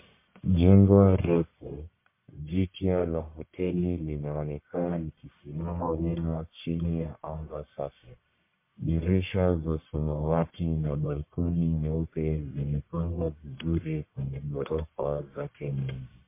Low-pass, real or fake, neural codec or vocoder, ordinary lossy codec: 3.6 kHz; fake; codec, 44.1 kHz, 1.7 kbps, Pupu-Codec; MP3, 24 kbps